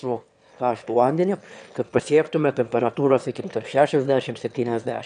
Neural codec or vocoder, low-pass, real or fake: autoencoder, 22.05 kHz, a latent of 192 numbers a frame, VITS, trained on one speaker; 9.9 kHz; fake